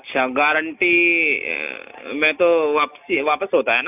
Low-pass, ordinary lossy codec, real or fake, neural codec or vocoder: 3.6 kHz; none; real; none